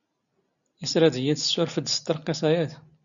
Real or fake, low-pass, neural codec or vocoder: real; 7.2 kHz; none